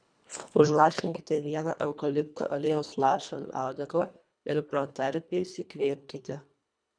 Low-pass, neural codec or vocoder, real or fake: 9.9 kHz; codec, 24 kHz, 1.5 kbps, HILCodec; fake